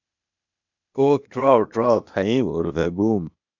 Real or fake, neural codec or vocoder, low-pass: fake; codec, 16 kHz, 0.8 kbps, ZipCodec; 7.2 kHz